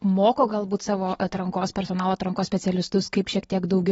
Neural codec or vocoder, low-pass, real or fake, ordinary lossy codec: none; 19.8 kHz; real; AAC, 24 kbps